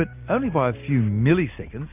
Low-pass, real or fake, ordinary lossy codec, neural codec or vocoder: 3.6 kHz; real; MP3, 32 kbps; none